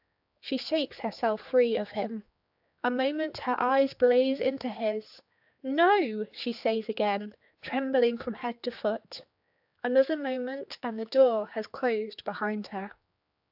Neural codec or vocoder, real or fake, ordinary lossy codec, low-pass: codec, 16 kHz, 4 kbps, X-Codec, HuBERT features, trained on general audio; fake; AAC, 48 kbps; 5.4 kHz